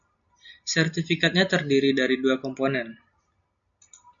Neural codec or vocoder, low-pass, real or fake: none; 7.2 kHz; real